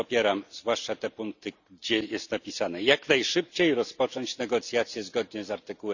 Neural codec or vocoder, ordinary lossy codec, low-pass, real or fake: none; none; 7.2 kHz; real